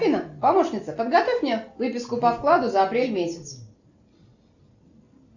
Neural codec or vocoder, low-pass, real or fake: none; 7.2 kHz; real